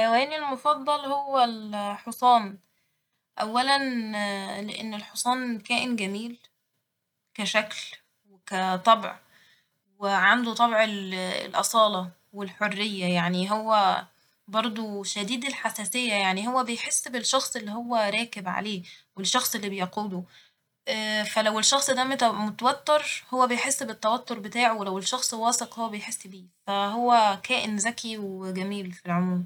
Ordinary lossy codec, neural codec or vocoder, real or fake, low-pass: none; none; real; 19.8 kHz